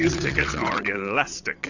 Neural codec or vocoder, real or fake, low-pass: vocoder, 22.05 kHz, 80 mel bands, WaveNeXt; fake; 7.2 kHz